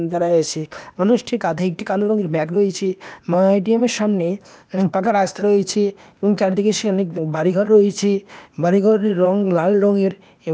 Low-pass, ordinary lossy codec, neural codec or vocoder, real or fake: none; none; codec, 16 kHz, 0.8 kbps, ZipCodec; fake